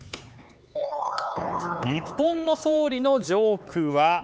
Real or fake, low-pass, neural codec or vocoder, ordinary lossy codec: fake; none; codec, 16 kHz, 4 kbps, X-Codec, HuBERT features, trained on LibriSpeech; none